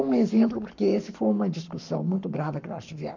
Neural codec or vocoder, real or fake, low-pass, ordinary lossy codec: codec, 44.1 kHz, 7.8 kbps, Pupu-Codec; fake; 7.2 kHz; none